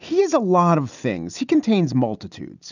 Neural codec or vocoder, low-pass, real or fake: none; 7.2 kHz; real